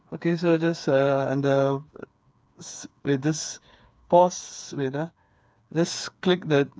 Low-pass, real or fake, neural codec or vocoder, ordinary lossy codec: none; fake; codec, 16 kHz, 4 kbps, FreqCodec, smaller model; none